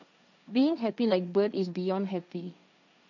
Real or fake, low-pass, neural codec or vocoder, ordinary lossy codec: fake; 7.2 kHz; codec, 16 kHz, 1.1 kbps, Voila-Tokenizer; none